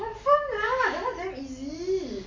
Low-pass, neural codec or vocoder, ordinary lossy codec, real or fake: 7.2 kHz; none; MP3, 48 kbps; real